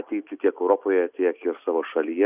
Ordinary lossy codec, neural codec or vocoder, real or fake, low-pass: Opus, 64 kbps; none; real; 3.6 kHz